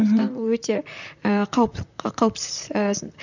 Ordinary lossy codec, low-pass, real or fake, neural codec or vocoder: none; 7.2 kHz; fake; vocoder, 44.1 kHz, 128 mel bands, Pupu-Vocoder